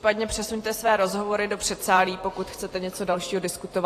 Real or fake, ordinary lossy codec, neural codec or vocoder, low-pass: fake; AAC, 48 kbps; vocoder, 44.1 kHz, 128 mel bands every 512 samples, BigVGAN v2; 14.4 kHz